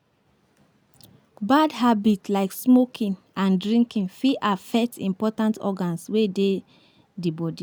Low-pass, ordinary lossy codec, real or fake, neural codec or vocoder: none; none; real; none